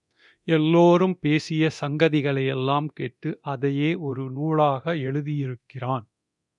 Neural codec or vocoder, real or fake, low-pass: codec, 24 kHz, 0.9 kbps, DualCodec; fake; 10.8 kHz